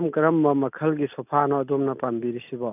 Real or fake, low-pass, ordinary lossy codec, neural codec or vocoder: real; 3.6 kHz; none; none